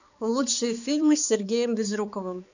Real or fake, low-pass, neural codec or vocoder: fake; 7.2 kHz; codec, 16 kHz, 4 kbps, X-Codec, HuBERT features, trained on balanced general audio